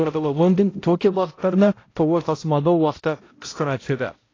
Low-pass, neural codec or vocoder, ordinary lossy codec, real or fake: 7.2 kHz; codec, 16 kHz, 0.5 kbps, X-Codec, HuBERT features, trained on balanced general audio; AAC, 32 kbps; fake